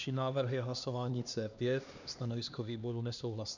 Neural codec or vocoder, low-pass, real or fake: codec, 16 kHz, 2 kbps, X-Codec, HuBERT features, trained on LibriSpeech; 7.2 kHz; fake